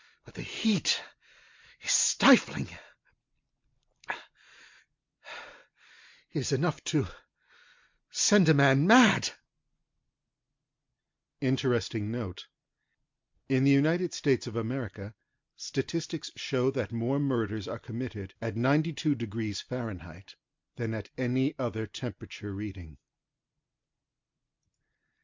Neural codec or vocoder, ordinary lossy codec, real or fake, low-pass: none; MP3, 64 kbps; real; 7.2 kHz